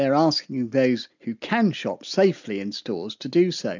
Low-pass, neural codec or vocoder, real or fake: 7.2 kHz; none; real